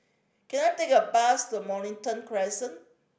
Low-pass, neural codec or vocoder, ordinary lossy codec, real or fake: none; none; none; real